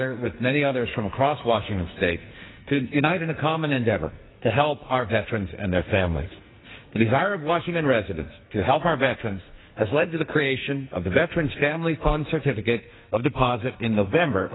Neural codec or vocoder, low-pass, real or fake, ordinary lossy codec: codec, 44.1 kHz, 2.6 kbps, SNAC; 7.2 kHz; fake; AAC, 16 kbps